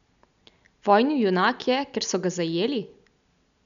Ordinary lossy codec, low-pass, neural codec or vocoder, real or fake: none; 7.2 kHz; none; real